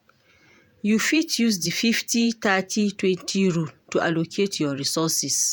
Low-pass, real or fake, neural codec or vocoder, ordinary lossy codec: none; fake; vocoder, 48 kHz, 128 mel bands, Vocos; none